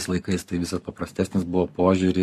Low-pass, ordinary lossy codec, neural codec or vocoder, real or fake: 14.4 kHz; MP3, 64 kbps; codec, 44.1 kHz, 7.8 kbps, Pupu-Codec; fake